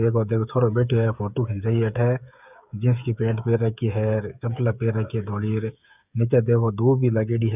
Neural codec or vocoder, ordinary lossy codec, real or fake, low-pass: codec, 16 kHz, 8 kbps, FreqCodec, smaller model; none; fake; 3.6 kHz